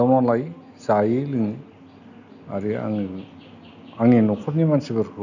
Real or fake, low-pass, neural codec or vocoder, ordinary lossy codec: real; 7.2 kHz; none; none